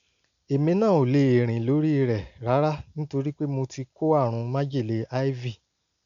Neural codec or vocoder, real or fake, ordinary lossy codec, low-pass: none; real; none; 7.2 kHz